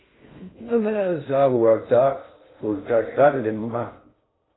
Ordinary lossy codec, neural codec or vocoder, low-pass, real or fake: AAC, 16 kbps; codec, 16 kHz in and 24 kHz out, 0.6 kbps, FocalCodec, streaming, 2048 codes; 7.2 kHz; fake